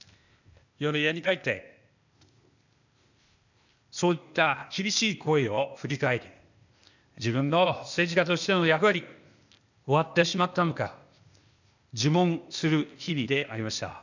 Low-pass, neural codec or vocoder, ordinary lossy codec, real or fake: 7.2 kHz; codec, 16 kHz, 0.8 kbps, ZipCodec; none; fake